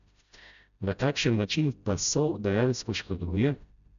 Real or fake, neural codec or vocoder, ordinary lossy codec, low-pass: fake; codec, 16 kHz, 0.5 kbps, FreqCodec, smaller model; none; 7.2 kHz